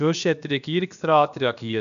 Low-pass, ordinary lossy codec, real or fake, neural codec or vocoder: 7.2 kHz; none; fake; codec, 16 kHz, about 1 kbps, DyCAST, with the encoder's durations